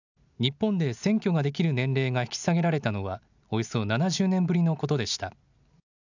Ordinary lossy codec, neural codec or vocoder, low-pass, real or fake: none; none; 7.2 kHz; real